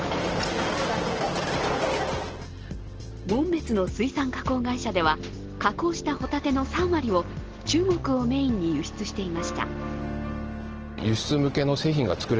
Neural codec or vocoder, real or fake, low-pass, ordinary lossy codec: none; real; 7.2 kHz; Opus, 16 kbps